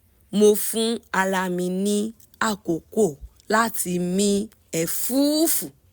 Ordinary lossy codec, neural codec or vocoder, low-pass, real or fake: none; none; none; real